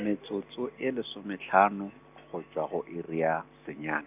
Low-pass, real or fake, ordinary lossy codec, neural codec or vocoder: 3.6 kHz; real; none; none